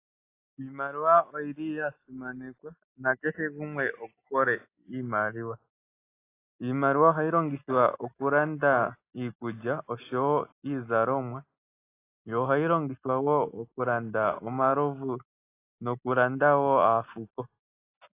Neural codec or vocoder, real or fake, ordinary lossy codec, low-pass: none; real; AAC, 24 kbps; 3.6 kHz